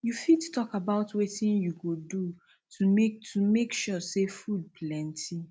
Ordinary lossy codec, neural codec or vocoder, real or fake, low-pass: none; none; real; none